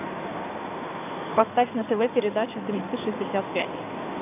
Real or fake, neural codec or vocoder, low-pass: fake; codec, 16 kHz in and 24 kHz out, 2.2 kbps, FireRedTTS-2 codec; 3.6 kHz